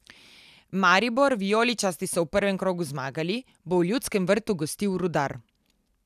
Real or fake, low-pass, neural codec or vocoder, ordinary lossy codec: real; 14.4 kHz; none; none